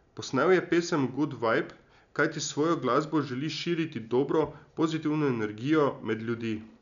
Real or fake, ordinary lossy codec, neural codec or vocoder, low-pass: real; none; none; 7.2 kHz